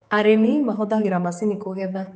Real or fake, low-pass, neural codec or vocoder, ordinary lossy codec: fake; none; codec, 16 kHz, 4 kbps, X-Codec, HuBERT features, trained on general audio; none